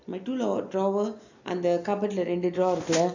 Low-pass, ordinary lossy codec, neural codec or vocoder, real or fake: 7.2 kHz; AAC, 48 kbps; none; real